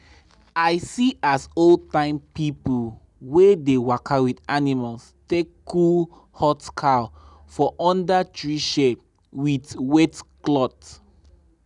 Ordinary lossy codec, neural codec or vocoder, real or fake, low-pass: none; none; real; 10.8 kHz